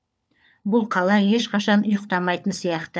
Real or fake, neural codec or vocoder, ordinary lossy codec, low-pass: fake; codec, 16 kHz, 4 kbps, FunCodec, trained on LibriTTS, 50 frames a second; none; none